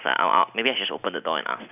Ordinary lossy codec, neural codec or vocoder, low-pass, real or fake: none; none; 3.6 kHz; real